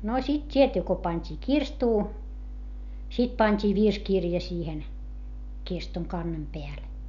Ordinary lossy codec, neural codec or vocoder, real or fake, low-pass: none; none; real; 7.2 kHz